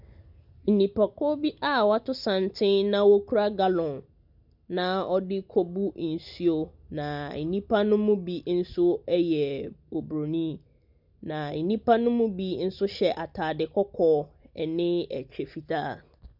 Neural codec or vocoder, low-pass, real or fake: none; 5.4 kHz; real